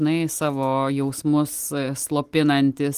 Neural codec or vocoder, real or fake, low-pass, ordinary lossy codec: none; real; 14.4 kHz; Opus, 24 kbps